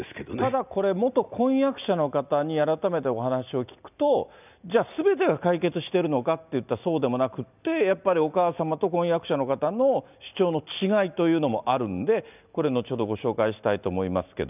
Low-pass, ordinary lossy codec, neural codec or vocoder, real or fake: 3.6 kHz; none; none; real